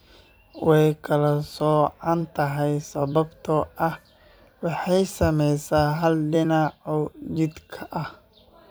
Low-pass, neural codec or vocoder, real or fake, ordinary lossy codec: none; vocoder, 44.1 kHz, 128 mel bands every 256 samples, BigVGAN v2; fake; none